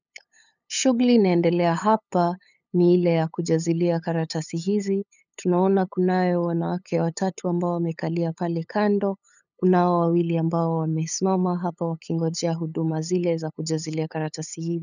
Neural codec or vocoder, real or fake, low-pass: codec, 16 kHz, 8 kbps, FunCodec, trained on LibriTTS, 25 frames a second; fake; 7.2 kHz